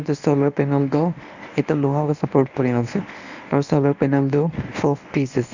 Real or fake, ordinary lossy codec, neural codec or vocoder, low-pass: fake; none; codec, 24 kHz, 0.9 kbps, WavTokenizer, medium speech release version 1; 7.2 kHz